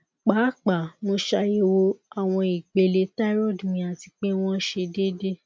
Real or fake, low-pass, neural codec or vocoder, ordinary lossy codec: real; none; none; none